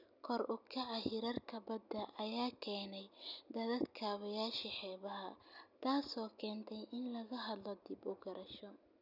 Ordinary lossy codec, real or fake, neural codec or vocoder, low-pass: none; real; none; 5.4 kHz